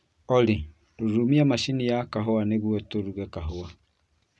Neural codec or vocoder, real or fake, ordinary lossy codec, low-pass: none; real; none; none